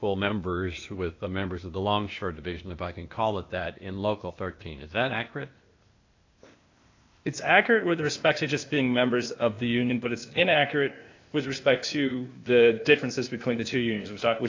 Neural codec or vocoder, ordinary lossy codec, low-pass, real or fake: codec, 16 kHz, 0.8 kbps, ZipCodec; AAC, 48 kbps; 7.2 kHz; fake